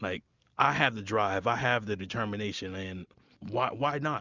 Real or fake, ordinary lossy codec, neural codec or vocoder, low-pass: real; Opus, 64 kbps; none; 7.2 kHz